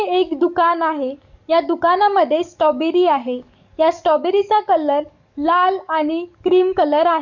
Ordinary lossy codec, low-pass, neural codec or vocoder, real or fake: none; 7.2 kHz; codec, 44.1 kHz, 7.8 kbps, DAC; fake